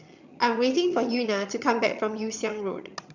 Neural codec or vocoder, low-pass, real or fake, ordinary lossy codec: vocoder, 22.05 kHz, 80 mel bands, HiFi-GAN; 7.2 kHz; fake; none